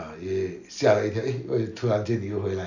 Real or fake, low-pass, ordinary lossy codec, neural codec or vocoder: real; 7.2 kHz; none; none